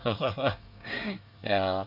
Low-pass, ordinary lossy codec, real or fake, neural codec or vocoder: 5.4 kHz; none; fake; codec, 24 kHz, 1 kbps, SNAC